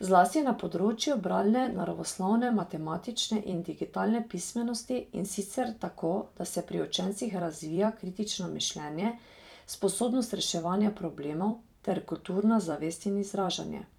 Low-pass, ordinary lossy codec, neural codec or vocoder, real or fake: 19.8 kHz; none; vocoder, 44.1 kHz, 128 mel bands every 256 samples, BigVGAN v2; fake